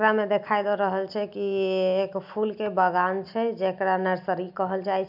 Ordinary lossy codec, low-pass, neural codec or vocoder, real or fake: none; 5.4 kHz; none; real